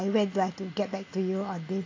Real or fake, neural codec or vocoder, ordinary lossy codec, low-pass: real; none; AAC, 32 kbps; 7.2 kHz